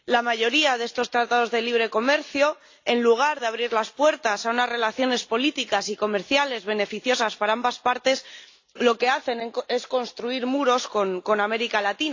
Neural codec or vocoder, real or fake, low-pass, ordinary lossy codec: none; real; 7.2 kHz; AAC, 48 kbps